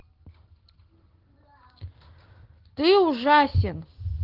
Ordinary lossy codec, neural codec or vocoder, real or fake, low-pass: Opus, 16 kbps; none; real; 5.4 kHz